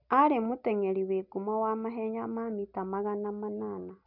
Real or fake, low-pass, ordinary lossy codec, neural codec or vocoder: real; 5.4 kHz; none; none